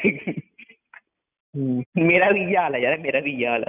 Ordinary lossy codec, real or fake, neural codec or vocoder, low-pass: none; real; none; 3.6 kHz